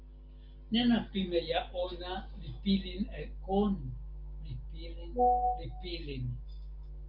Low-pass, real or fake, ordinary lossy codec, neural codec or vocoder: 5.4 kHz; real; Opus, 32 kbps; none